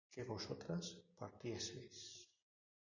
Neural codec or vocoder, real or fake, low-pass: none; real; 7.2 kHz